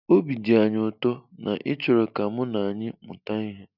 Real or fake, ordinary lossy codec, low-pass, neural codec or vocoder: real; none; 5.4 kHz; none